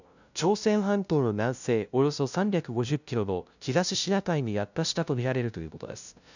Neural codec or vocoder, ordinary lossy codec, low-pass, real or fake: codec, 16 kHz, 0.5 kbps, FunCodec, trained on LibriTTS, 25 frames a second; none; 7.2 kHz; fake